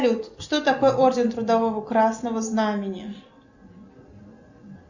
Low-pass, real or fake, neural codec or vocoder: 7.2 kHz; real; none